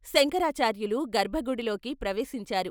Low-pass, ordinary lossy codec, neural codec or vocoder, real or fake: none; none; none; real